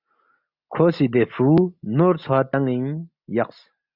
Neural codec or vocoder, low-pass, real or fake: none; 5.4 kHz; real